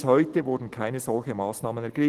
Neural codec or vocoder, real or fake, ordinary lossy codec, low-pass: none; real; Opus, 16 kbps; 14.4 kHz